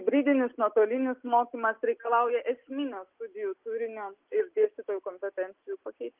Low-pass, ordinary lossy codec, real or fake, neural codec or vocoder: 3.6 kHz; Opus, 32 kbps; real; none